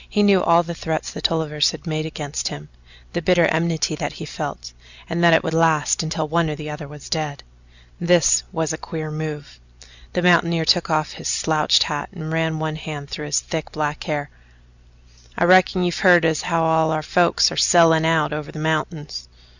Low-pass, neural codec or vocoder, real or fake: 7.2 kHz; none; real